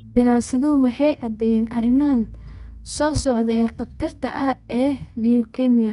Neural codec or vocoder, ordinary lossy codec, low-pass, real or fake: codec, 24 kHz, 0.9 kbps, WavTokenizer, medium music audio release; none; 10.8 kHz; fake